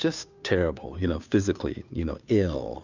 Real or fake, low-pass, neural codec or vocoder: fake; 7.2 kHz; codec, 16 kHz, 2 kbps, FunCodec, trained on Chinese and English, 25 frames a second